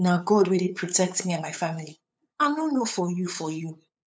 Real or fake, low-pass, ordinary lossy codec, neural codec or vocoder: fake; none; none; codec, 16 kHz, 8 kbps, FunCodec, trained on LibriTTS, 25 frames a second